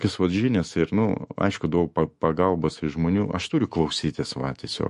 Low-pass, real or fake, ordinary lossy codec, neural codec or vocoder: 14.4 kHz; fake; MP3, 48 kbps; autoencoder, 48 kHz, 128 numbers a frame, DAC-VAE, trained on Japanese speech